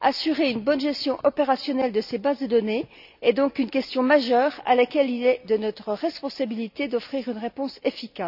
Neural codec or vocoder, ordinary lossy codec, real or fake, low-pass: none; none; real; 5.4 kHz